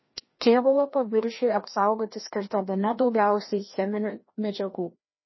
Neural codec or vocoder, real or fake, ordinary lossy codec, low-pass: codec, 16 kHz, 1 kbps, FreqCodec, larger model; fake; MP3, 24 kbps; 7.2 kHz